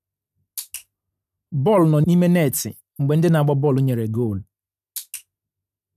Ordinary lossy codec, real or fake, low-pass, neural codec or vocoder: none; real; 14.4 kHz; none